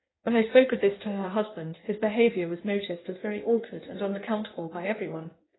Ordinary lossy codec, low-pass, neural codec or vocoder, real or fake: AAC, 16 kbps; 7.2 kHz; codec, 16 kHz in and 24 kHz out, 1.1 kbps, FireRedTTS-2 codec; fake